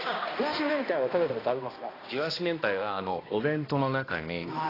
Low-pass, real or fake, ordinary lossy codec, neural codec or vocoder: 5.4 kHz; fake; AAC, 24 kbps; codec, 16 kHz, 1 kbps, X-Codec, HuBERT features, trained on balanced general audio